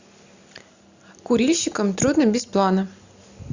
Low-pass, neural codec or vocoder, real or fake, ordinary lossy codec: 7.2 kHz; none; real; Opus, 64 kbps